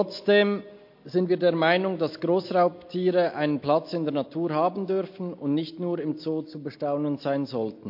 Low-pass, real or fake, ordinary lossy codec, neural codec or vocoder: 5.4 kHz; real; MP3, 32 kbps; none